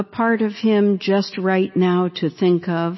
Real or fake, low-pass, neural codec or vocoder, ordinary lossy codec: real; 7.2 kHz; none; MP3, 24 kbps